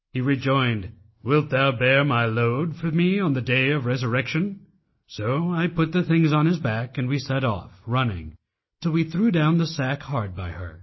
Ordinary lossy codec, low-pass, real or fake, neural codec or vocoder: MP3, 24 kbps; 7.2 kHz; real; none